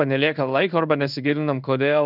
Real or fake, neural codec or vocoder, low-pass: fake; codec, 16 kHz in and 24 kHz out, 1 kbps, XY-Tokenizer; 5.4 kHz